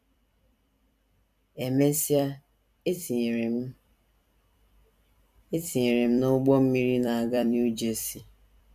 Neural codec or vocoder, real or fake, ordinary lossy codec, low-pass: vocoder, 44.1 kHz, 128 mel bands every 256 samples, BigVGAN v2; fake; none; 14.4 kHz